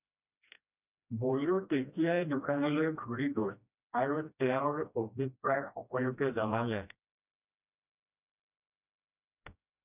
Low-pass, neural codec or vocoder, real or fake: 3.6 kHz; codec, 16 kHz, 1 kbps, FreqCodec, smaller model; fake